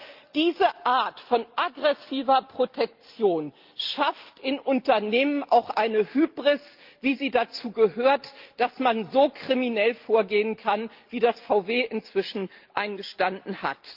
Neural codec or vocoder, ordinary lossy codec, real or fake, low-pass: none; Opus, 32 kbps; real; 5.4 kHz